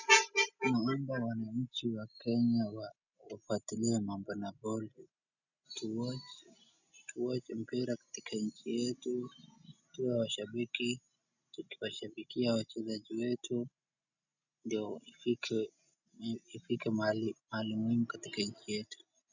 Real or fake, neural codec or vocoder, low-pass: real; none; 7.2 kHz